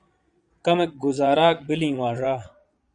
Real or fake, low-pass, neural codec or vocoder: fake; 9.9 kHz; vocoder, 22.05 kHz, 80 mel bands, Vocos